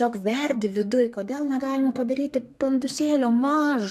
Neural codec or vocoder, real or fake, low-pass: codec, 32 kHz, 1.9 kbps, SNAC; fake; 14.4 kHz